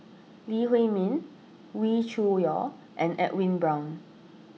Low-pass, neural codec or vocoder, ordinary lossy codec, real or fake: none; none; none; real